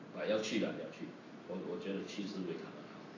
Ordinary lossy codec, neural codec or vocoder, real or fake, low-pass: AAC, 32 kbps; none; real; 7.2 kHz